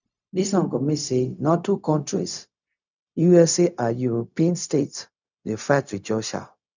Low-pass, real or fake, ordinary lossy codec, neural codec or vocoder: 7.2 kHz; fake; none; codec, 16 kHz, 0.4 kbps, LongCat-Audio-Codec